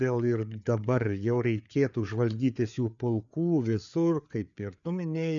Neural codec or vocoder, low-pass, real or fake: codec, 16 kHz, 4 kbps, FreqCodec, larger model; 7.2 kHz; fake